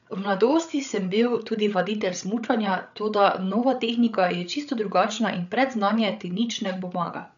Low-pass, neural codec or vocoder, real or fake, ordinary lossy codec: 7.2 kHz; codec, 16 kHz, 8 kbps, FreqCodec, larger model; fake; none